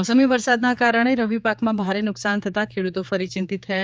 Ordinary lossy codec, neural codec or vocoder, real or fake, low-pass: none; codec, 16 kHz, 4 kbps, X-Codec, HuBERT features, trained on general audio; fake; none